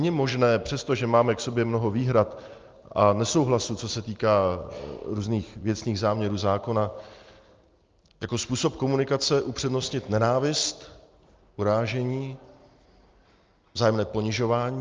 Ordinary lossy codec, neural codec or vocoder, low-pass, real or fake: Opus, 24 kbps; none; 7.2 kHz; real